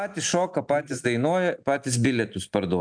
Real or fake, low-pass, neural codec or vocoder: real; 9.9 kHz; none